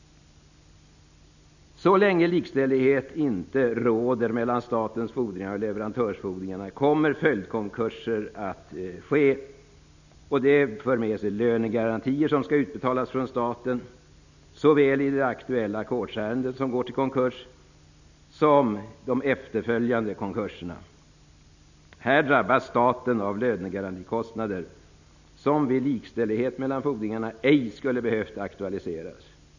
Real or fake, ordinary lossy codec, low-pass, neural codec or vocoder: real; none; 7.2 kHz; none